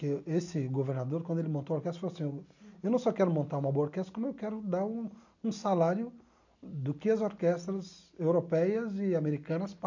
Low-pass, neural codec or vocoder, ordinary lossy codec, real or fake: 7.2 kHz; none; none; real